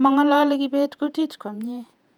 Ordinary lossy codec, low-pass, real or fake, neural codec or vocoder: none; 19.8 kHz; fake; vocoder, 48 kHz, 128 mel bands, Vocos